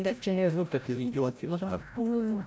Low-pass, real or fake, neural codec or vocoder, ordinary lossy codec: none; fake; codec, 16 kHz, 0.5 kbps, FreqCodec, larger model; none